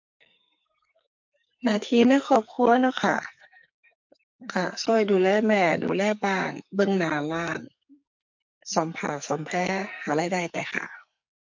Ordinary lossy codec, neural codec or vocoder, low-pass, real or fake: MP3, 48 kbps; codec, 44.1 kHz, 2.6 kbps, SNAC; 7.2 kHz; fake